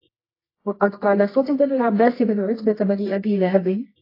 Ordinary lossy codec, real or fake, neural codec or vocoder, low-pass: AAC, 24 kbps; fake; codec, 24 kHz, 0.9 kbps, WavTokenizer, medium music audio release; 5.4 kHz